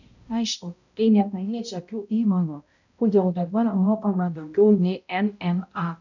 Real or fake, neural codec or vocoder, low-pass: fake; codec, 16 kHz, 0.5 kbps, X-Codec, HuBERT features, trained on balanced general audio; 7.2 kHz